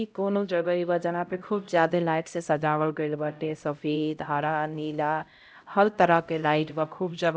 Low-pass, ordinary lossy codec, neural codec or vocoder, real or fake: none; none; codec, 16 kHz, 0.5 kbps, X-Codec, HuBERT features, trained on LibriSpeech; fake